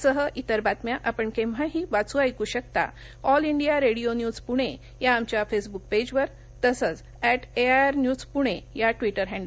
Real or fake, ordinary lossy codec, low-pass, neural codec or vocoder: real; none; none; none